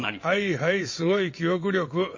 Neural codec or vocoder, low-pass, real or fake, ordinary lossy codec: vocoder, 22.05 kHz, 80 mel bands, WaveNeXt; 7.2 kHz; fake; MP3, 32 kbps